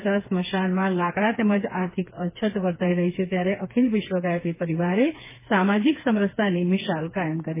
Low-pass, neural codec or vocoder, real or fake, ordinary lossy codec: 3.6 kHz; codec, 16 kHz, 4 kbps, FreqCodec, smaller model; fake; MP3, 16 kbps